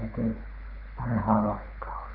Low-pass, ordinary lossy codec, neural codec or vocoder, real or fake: 5.4 kHz; none; codec, 24 kHz, 6 kbps, HILCodec; fake